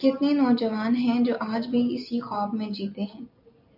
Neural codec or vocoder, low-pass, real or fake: none; 5.4 kHz; real